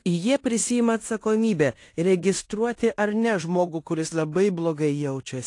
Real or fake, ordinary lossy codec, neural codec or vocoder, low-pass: fake; AAC, 48 kbps; codec, 16 kHz in and 24 kHz out, 0.9 kbps, LongCat-Audio-Codec, fine tuned four codebook decoder; 10.8 kHz